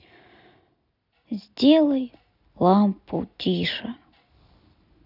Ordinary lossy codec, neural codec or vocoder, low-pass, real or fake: none; none; 5.4 kHz; real